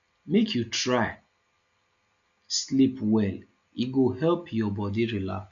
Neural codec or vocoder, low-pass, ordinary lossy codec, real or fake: none; 7.2 kHz; none; real